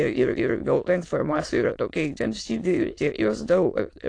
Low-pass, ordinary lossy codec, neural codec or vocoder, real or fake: 9.9 kHz; AAC, 48 kbps; autoencoder, 22.05 kHz, a latent of 192 numbers a frame, VITS, trained on many speakers; fake